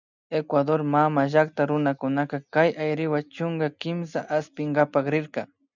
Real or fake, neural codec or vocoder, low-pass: real; none; 7.2 kHz